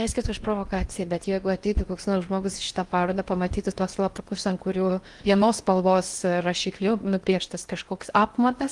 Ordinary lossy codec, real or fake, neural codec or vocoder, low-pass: Opus, 24 kbps; fake; codec, 16 kHz in and 24 kHz out, 0.8 kbps, FocalCodec, streaming, 65536 codes; 10.8 kHz